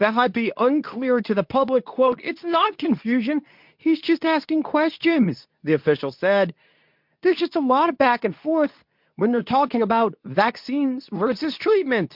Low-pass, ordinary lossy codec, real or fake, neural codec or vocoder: 5.4 kHz; MP3, 48 kbps; fake; codec, 24 kHz, 0.9 kbps, WavTokenizer, medium speech release version 2